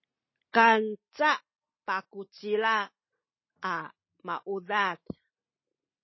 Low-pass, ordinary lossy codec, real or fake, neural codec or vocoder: 7.2 kHz; MP3, 24 kbps; real; none